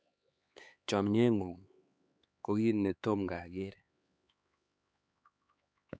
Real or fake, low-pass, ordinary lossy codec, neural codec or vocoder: fake; none; none; codec, 16 kHz, 4 kbps, X-Codec, HuBERT features, trained on LibriSpeech